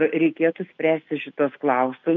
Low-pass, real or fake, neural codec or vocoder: 7.2 kHz; real; none